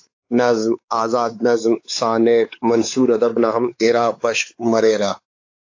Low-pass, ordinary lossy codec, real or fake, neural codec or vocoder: 7.2 kHz; AAC, 48 kbps; fake; codec, 16 kHz, 4 kbps, X-Codec, WavLM features, trained on Multilingual LibriSpeech